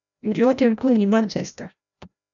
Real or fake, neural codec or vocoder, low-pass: fake; codec, 16 kHz, 0.5 kbps, FreqCodec, larger model; 7.2 kHz